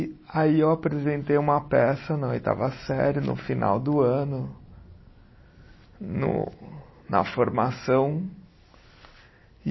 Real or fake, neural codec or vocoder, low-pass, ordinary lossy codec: real; none; 7.2 kHz; MP3, 24 kbps